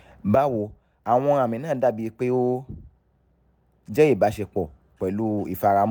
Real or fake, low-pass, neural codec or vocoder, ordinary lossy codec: real; none; none; none